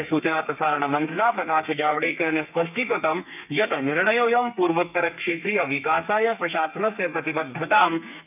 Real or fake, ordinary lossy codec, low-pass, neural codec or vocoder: fake; none; 3.6 kHz; codec, 32 kHz, 1.9 kbps, SNAC